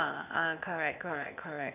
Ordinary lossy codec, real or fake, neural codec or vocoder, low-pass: none; fake; codec, 16 kHz, 0.8 kbps, ZipCodec; 3.6 kHz